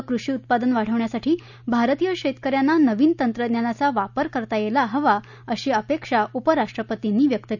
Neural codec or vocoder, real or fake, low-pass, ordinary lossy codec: none; real; 7.2 kHz; none